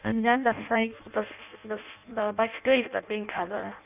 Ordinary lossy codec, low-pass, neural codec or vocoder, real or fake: none; 3.6 kHz; codec, 16 kHz in and 24 kHz out, 0.6 kbps, FireRedTTS-2 codec; fake